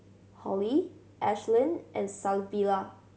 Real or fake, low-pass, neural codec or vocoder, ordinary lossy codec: real; none; none; none